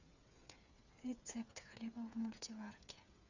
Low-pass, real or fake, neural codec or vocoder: 7.2 kHz; fake; codec, 16 kHz, 8 kbps, FreqCodec, smaller model